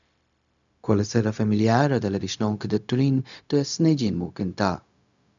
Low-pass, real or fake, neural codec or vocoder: 7.2 kHz; fake; codec, 16 kHz, 0.4 kbps, LongCat-Audio-Codec